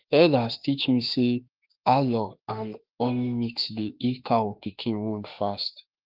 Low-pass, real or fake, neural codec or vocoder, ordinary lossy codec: 5.4 kHz; fake; autoencoder, 48 kHz, 32 numbers a frame, DAC-VAE, trained on Japanese speech; Opus, 32 kbps